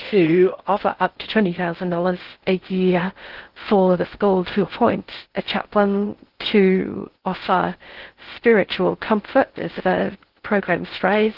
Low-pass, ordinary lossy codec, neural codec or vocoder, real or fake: 5.4 kHz; Opus, 16 kbps; codec, 16 kHz in and 24 kHz out, 0.6 kbps, FocalCodec, streaming, 2048 codes; fake